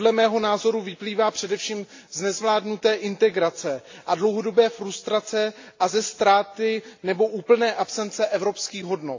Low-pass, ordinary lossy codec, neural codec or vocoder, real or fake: 7.2 kHz; AAC, 48 kbps; none; real